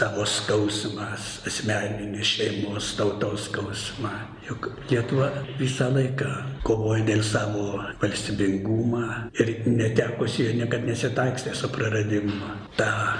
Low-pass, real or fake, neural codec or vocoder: 9.9 kHz; real; none